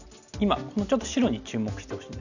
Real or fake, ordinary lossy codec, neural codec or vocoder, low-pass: real; none; none; 7.2 kHz